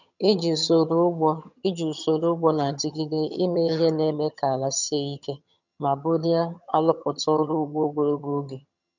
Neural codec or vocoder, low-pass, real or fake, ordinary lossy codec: vocoder, 22.05 kHz, 80 mel bands, HiFi-GAN; 7.2 kHz; fake; none